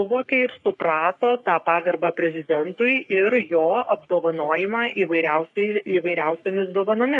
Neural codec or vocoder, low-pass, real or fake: codec, 44.1 kHz, 3.4 kbps, Pupu-Codec; 10.8 kHz; fake